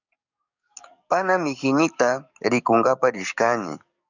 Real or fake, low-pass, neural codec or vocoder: fake; 7.2 kHz; codec, 44.1 kHz, 7.8 kbps, DAC